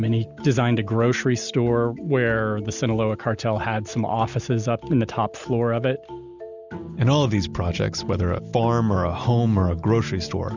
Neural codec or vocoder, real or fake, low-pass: none; real; 7.2 kHz